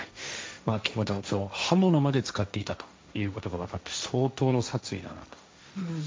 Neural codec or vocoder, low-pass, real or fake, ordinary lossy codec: codec, 16 kHz, 1.1 kbps, Voila-Tokenizer; none; fake; none